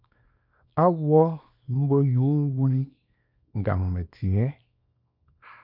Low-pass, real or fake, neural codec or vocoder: 5.4 kHz; fake; codec, 24 kHz, 0.9 kbps, WavTokenizer, small release